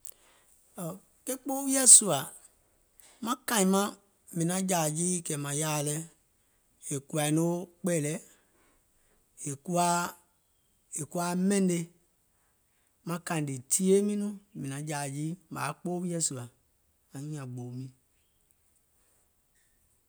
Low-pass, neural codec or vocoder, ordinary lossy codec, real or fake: none; none; none; real